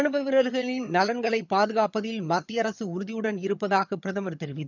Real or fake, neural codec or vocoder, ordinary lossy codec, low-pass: fake; vocoder, 22.05 kHz, 80 mel bands, HiFi-GAN; none; 7.2 kHz